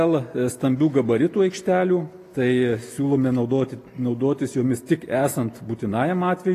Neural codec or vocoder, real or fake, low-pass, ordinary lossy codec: none; real; 14.4 kHz; AAC, 48 kbps